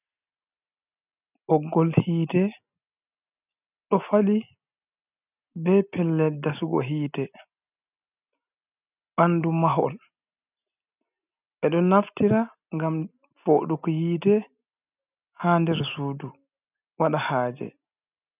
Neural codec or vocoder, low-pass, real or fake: none; 3.6 kHz; real